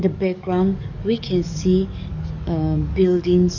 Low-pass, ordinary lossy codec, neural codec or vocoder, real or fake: 7.2 kHz; none; codec, 44.1 kHz, 7.8 kbps, DAC; fake